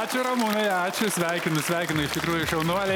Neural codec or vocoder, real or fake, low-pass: none; real; 19.8 kHz